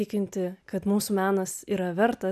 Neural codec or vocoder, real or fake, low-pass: none; real; 14.4 kHz